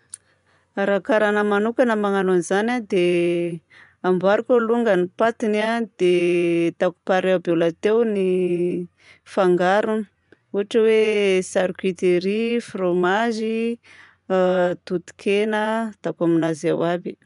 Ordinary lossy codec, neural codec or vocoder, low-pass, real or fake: none; vocoder, 24 kHz, 100 mel bands, Vocos; 10.8 kHz; fake